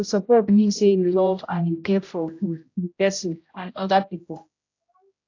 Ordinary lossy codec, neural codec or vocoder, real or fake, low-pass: AAC, 48 kbps; codec, 16 kHz, 0.5 kbps, X-Codec, HuBERT features, trained on general audio; fake; 7.2 kHz